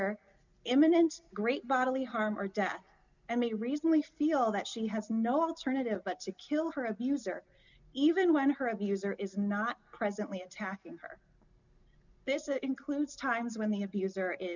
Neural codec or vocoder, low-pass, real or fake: none; 7.2 kHz; real